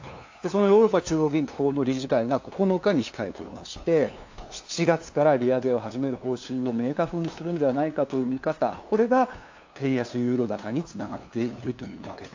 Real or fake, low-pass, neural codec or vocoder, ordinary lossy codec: fake; 7.2 kHz; codec, 16 kHz, 2 kbps, FunCodec, trained on LibriTTS, 25 frames a second; AAC, 48 kbps